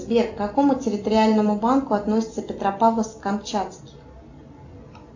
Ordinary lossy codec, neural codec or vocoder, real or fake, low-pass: AAC, 48 kbps; none; real; 7.2 kHz